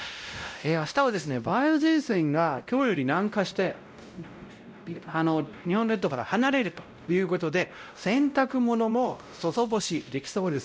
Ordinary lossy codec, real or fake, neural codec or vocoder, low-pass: none; fake; codec, 16 kHz, 0.5 kbps, X-Codec, WavLM features, trained on Multilingual LibriSpeech; none